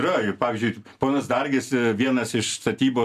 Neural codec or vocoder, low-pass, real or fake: none; 14.4 kHz; real